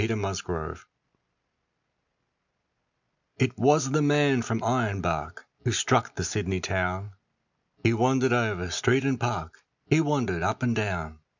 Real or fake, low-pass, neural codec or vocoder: real; 7.2 kHz; none